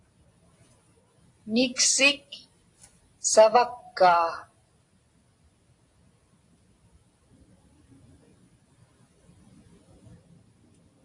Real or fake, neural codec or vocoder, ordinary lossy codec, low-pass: real; none; AAC, 48 kbps; 10.8 kHz